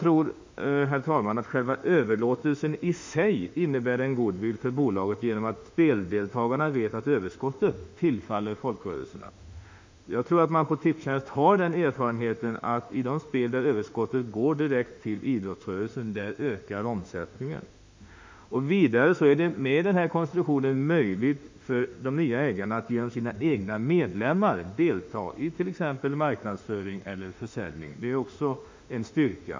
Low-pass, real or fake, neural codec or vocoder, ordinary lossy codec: 7.2 kHz; fake; autoencoder, 48 kHz, 32 numbers a frame, DAC-VAE, trained on Japanese speech; MP3, 64 kbps